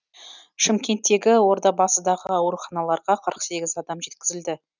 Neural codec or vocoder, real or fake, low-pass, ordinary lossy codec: none; real; 7.2 kHz; none